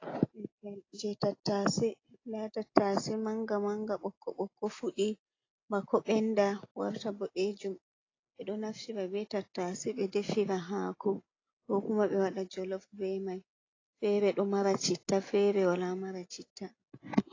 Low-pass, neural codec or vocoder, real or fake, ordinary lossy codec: 7.2 kHz; none; real; AAC, 32 kbps